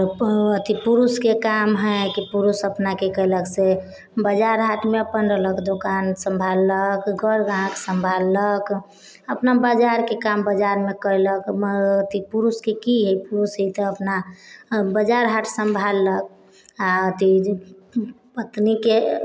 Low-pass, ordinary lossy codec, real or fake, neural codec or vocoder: none; none; real; none